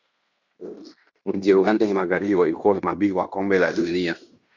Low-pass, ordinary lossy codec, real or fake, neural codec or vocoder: 7.2 kHz; Opus, 64 kbps; fake; codec, 16 kHz in and 24 kHz out, 0.9 kbps, LongCat-Audio-Codec, fine tuned four codebook decoder